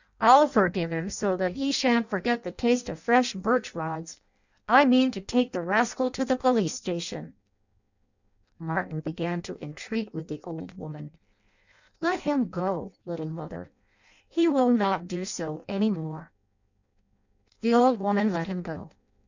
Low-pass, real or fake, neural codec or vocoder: 7.2 kHz; fake; codec, 16 kHz in and 24 kHz out, 0.6 kbps, FireRedTTS-2 codec